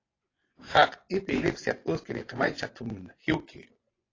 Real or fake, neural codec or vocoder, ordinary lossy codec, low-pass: fake; vocoder, 24 kHz, 100 mel bands, Vocos; AAC, 32 kbps; 7.2 kHz